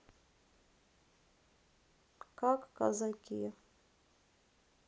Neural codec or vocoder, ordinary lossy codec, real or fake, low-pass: none; none; real; none